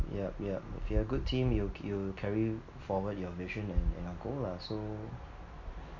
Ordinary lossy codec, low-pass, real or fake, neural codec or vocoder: none; 7.2 kHz; real; none